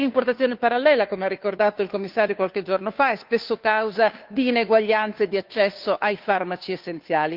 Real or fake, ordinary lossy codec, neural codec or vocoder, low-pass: fake; Opus, 32 kbps; codec, 16 kHz, 2 kbps, FunCodec, trained on Chinese and English, 25 frames a second; 5.4 kHz